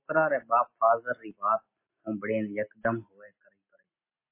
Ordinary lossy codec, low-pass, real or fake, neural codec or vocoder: MP3, 24 kbps; 3.6 kHz; real; none